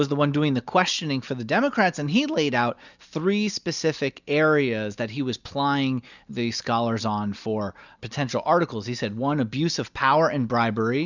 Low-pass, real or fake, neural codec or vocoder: 7.2 kHz; real; none